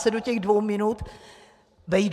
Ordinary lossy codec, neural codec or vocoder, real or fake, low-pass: MP3, 96 kbps; vocoder, 44.1 kHz, 128 mel bands every 512 samples, BigVGAN v2; fake; 14.4 kHz